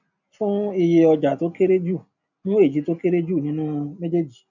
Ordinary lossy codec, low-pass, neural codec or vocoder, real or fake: none; 7.2 kHz; none; real